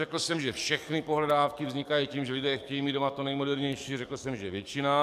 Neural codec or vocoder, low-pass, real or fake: codec, 44.1 kHz, 7.8 kbps, DAC; 14.4 kHz; fake